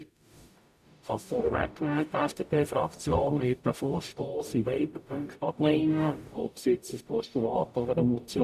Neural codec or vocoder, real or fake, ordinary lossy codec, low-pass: codec, 44.1 kHz, 0.9 kbps, DAC; fake; none; 14.4 kHz